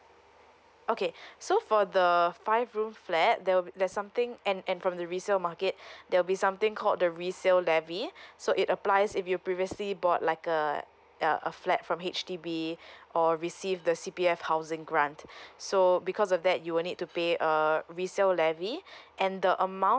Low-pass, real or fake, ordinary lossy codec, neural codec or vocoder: none; real; none; none